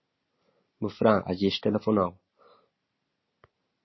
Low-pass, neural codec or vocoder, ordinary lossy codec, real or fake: 7.2 kHz; none; MP3, 24 kbps; real